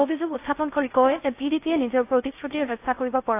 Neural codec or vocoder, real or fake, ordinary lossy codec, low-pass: codec, 16 kHz in and 24 kHz out, 0.6 kbps, FocalCodec, streaming, 2048 codes; fake; AAC, 24 kbps; 3.6 kHz